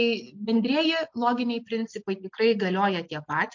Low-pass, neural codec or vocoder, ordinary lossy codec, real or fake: 7.2 kHz; none; MP3, 48 kbps; real